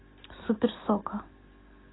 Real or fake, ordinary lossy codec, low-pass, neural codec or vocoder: real; AAC, 16 kbps; 7.2 kHz; none